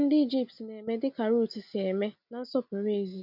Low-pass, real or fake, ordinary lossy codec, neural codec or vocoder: 5.4 kHz; real; none; none